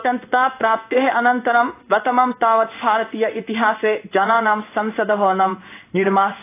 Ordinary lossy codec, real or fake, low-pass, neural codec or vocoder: AAC, 24 kbps; fake; 3.6 kHz; codec, 16 kHz in and 24 kHz out, 1 kbps, XY-Tokenizer